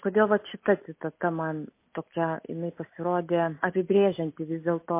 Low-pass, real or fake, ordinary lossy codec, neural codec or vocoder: 3.6 kHz; real; MP3, 24 kbps; none